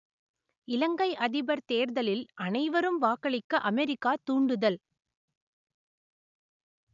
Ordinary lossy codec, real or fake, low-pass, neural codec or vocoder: none; real; 7.2 kHz; none